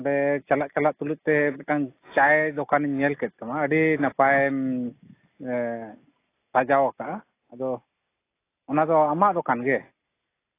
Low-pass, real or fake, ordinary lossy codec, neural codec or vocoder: 3.6 kHz; real; AAC, 24 kbps; none